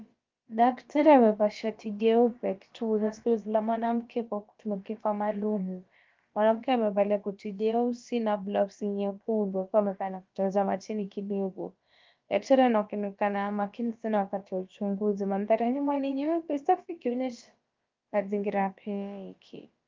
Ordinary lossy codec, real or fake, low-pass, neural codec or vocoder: Opus, 32 kbps; fake; 7.2 kHz; codec, 16 kHz, about 1 kbps, DyCAST, with the encoder's durations